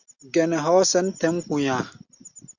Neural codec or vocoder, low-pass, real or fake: none; 7.2 kHz; real